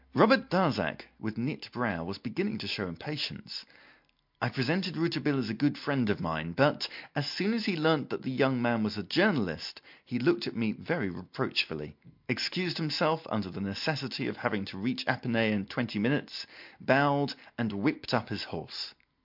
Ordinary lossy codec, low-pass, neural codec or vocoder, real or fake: MP3, 48 kbps; 5.4 kHz; none; real